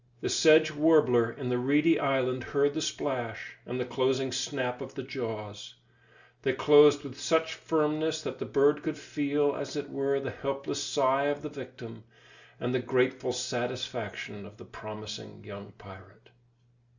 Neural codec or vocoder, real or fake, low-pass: none; real; 7.2 kHz